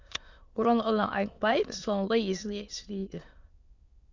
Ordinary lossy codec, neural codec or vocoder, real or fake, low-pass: Opus, 64 kbps; autoencoder, 22.05 kHz, a latent of 192 numbers a frame, VITS, trained on many speakers; fake; 7.2 kHz